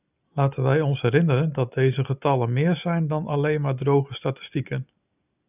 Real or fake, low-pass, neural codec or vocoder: real; 3.6 kHz; none